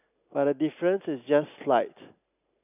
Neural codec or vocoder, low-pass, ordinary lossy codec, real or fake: none; 3.6 kHz; none; real